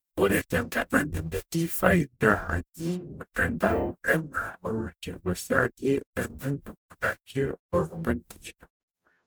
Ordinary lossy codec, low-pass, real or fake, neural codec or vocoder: none; none; fake; codec, 44.1 kHz, 0.9 kbps, DAC